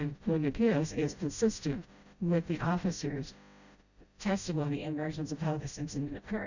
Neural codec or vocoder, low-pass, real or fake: codec, 16 kHz, 0.5 kbps, FreqCodec, smaller model; 7.2 kHz; fake